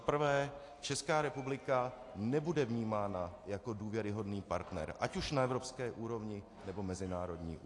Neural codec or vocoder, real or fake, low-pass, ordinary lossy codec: none; real; 10.8 kHz; AAC, 48 kbps